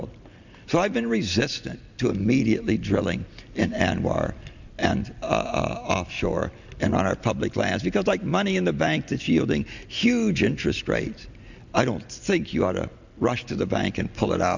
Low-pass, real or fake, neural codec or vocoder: 7.2 kHz; real; none